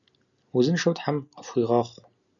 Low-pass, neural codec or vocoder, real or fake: 7.2 kHz; none; real